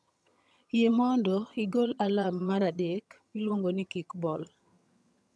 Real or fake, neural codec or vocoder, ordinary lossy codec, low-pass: fake; vocoder, 22.05 kHz, 80 mel bands, HiFi-GAN; none; none